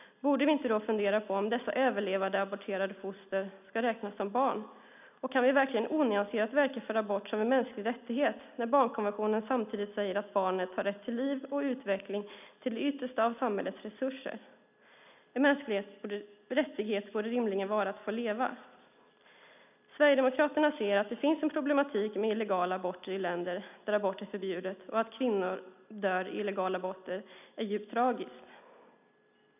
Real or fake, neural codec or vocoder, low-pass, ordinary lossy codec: real; none; 3.6 kHz; none